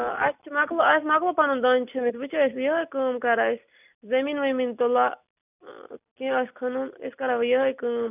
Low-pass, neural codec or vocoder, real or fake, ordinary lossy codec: 3.6 kHz; none; real; none